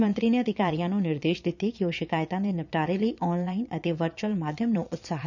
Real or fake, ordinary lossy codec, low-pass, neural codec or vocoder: fake; none; 7.2 kHz; vocoder, 22.05 kHz, 80 mel bands, Vocos